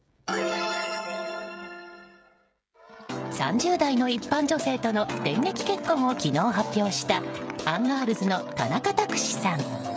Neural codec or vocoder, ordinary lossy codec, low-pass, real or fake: codec, 16 kHz, 16 kbps, FreqCodec, smaller model; none; none; fake